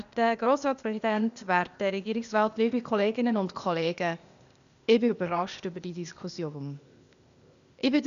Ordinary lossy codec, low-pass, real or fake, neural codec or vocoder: none; 7.2 kHz; fake; codec, 16 kHz, 0.8 kbps, ZipCodec